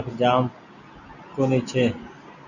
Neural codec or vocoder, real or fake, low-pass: none; real; 7.2 kHz